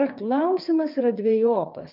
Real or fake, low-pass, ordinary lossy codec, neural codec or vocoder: fake; 5.4 kHz; MP3, 48 kbps; vocoder, 22.05 kHz, 80 mel bands, WaveNeXt